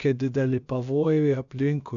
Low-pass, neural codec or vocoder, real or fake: 7.2 kHz; codec, 16 kHz, about 1 kbps, DyCAST, with the encoder's durations; fake